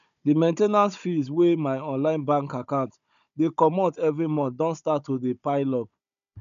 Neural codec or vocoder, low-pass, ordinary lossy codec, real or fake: codec, 16 kHz, 16 kbps, FunCodec, trained on Chinese and English, 50 frames a second; 7.2 kHz; none; fake